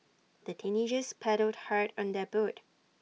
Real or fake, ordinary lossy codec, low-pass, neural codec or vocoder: real; none; none; none